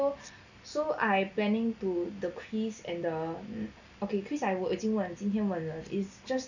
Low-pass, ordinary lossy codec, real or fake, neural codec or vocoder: 7.2 kHz; none; real; none